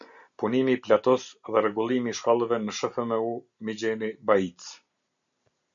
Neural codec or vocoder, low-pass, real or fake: none; 7.2 kHz; real